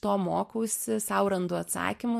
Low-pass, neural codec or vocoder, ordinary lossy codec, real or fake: 14.4 kHz; vocoder, 44.1 kHz, 128 mel bands every 512 samples, BigVGAN v2; MP3, 64 kbps; fake